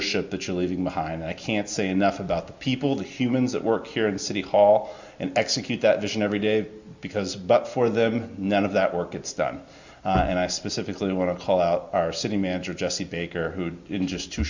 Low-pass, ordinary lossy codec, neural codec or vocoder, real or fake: 7.2 kHz; Opus, 64 kbps; none; real